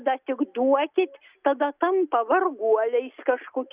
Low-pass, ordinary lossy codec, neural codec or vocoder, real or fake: 3.6 kHz; Opus, 64 kbps; none; real